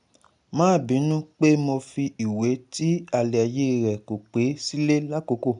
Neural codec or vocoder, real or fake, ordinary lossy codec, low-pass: none; real; none; 9.9 kHz